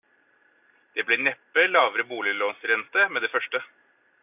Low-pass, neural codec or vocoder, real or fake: 3.6 kHz; none; real